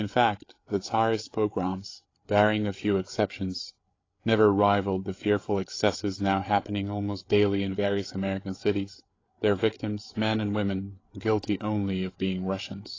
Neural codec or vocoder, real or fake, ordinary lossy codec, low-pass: codec, 16 kHz, 8 kbps, FreqCodec, larger model; fake; AAC, 32 kbps; 7.2 kHz